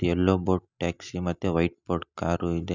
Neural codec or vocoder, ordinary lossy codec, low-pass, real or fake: none; none; 7.2 kHz; real